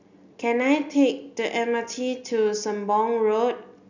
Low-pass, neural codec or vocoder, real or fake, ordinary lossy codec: 7.2 kHz; none; real; none